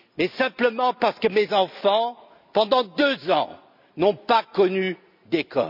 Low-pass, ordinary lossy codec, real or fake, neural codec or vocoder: 5.4 kHz; none; real; none